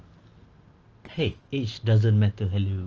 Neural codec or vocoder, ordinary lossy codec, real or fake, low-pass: none; Opus, 24 kbps; real; 7.2 kHz